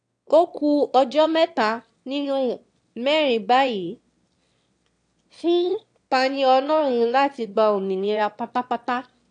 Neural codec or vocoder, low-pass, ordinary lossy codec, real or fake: autoencoder, 22.05 kHz, a latent of 192 numbers a frame, VITS, trained on one speaker; 9.9 kHz; AAC, 64 kbps; fake